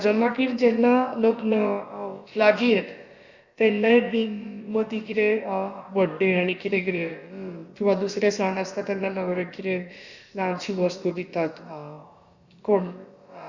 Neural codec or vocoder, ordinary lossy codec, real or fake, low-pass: codec, 16 kHz, about 1 kbps, DyCAST, with the encoder's durations; Opus, 64 kbps; fake; 7.2 kHz